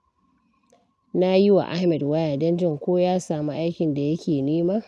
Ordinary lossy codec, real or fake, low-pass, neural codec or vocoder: none; real; none; none